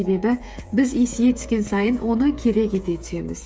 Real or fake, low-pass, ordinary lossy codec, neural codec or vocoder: fake; none; none; codec, 16 kHz, 8 kbps, FreqCodec, smaller model